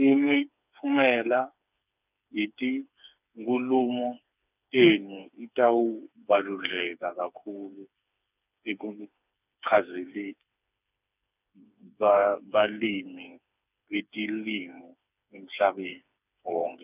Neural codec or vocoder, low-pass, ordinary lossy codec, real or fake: codec, 16 kHz, 4 kbps, FreqCodec, smaller model; 3.6 kHz; none; fake